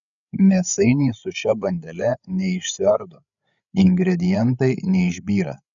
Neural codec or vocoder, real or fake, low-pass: codec, 16 kHz, 8 kbps, FreqCodec, larger model; fake; 7.2 kHz